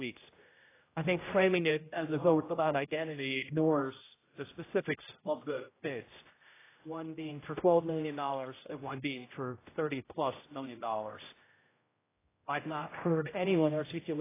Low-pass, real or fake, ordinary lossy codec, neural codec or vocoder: 3.6 kHz; fake; AAC, 16 kbps; codec, 16 kHz, 0.5 kbps, X-Codec, HuBERT features, trained on general audio